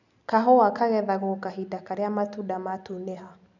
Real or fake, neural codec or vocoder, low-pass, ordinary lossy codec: real; none; 7.2 kHz; none